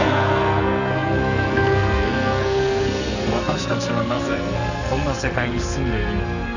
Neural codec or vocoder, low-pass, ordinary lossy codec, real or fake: codec, 44.1 kHz, 2.6 kbps, SNAC; 7.2 kHz; none; fake